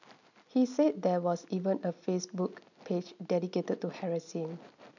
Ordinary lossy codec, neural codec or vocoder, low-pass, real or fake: none; none; 7.2 kHz; real